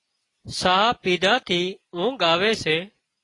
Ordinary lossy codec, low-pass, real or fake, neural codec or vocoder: AAC, 32 kbps; 10.8 kHz; real; none